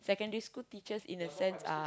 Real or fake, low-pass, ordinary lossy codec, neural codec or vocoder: real; none; none; none